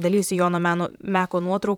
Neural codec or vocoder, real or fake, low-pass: vocoder, 44.1 kHz, 128 mel bands, Pupu-Vocoder; fake; 19.8 kHz